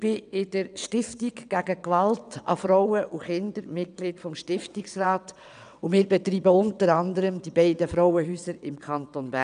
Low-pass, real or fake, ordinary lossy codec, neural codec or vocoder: 9.9 kHz; fake; none; vocoder, 22.05 kHz, 80 mel bands, WaveNeXt